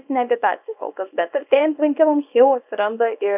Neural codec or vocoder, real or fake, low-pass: codec, 24 kHz, 0.9 kbps, WavTokenizer, small release; fake; 3.6 kHz